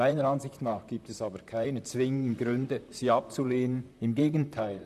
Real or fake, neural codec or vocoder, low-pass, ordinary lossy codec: fake; vocoder, 44.1 kHz, 128 mel bands, Pupu-Vocoder; 14.4 kHz; none